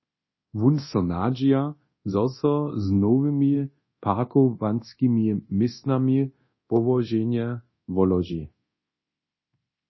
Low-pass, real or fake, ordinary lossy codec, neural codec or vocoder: 7.2 kHz; fake; MP3, 24 kbps; codec, 24 kHz, 0.9 kbps, DualCodec